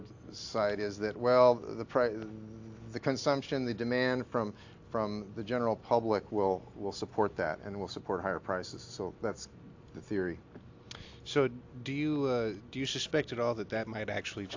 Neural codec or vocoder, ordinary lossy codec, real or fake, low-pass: none; AAC, 48 kbps; real; 7.2 kHz